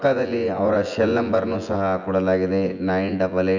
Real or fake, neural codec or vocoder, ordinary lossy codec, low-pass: fake; vocoder, 24 kHz, 100 mel bands, Vocos; none; 7.2 kHz